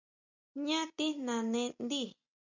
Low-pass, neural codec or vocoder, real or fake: 7.2 kHz; none; real